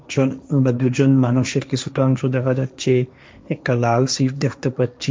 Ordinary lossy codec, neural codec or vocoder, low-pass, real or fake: none; codec, 16 kHz, 1.1 kbps, Voila-Tokenizer; none; fake